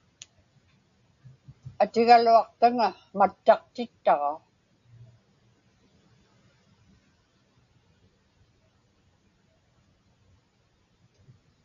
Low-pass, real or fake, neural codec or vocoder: 7.2 kHz; real; none